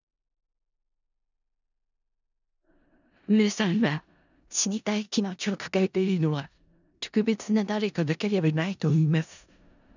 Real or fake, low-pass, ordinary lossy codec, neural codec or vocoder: fake; 7.2 kHz; none; codec, 16 kHz in and 24 kHz out, 0.4 kbps, LongCat-Audio-Codec, four codebook decoder